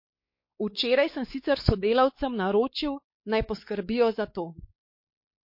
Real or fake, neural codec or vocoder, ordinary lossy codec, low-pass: fake; codec, 16 kHz, 4 kbps, X-Codec, WavLM features, trained on Multilingual LibriSpeech; MP3, 32 kbps; 5.4 kHz